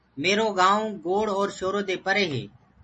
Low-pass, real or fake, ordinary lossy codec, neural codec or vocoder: 10.8 kHz; real; MP3, 32 kbps; none